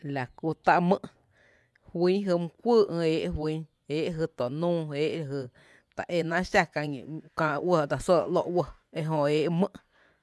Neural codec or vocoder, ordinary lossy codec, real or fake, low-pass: vocoder, 24 kHz, 100 mel bands, Vocos; none; fake; none